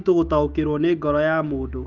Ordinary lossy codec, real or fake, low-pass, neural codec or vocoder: Opus, 24 kbps; fake; 7.2 kHz; autoencoder, 48 kHz, 128 numbers a frame, DAC-VAE, trained on Japanese speech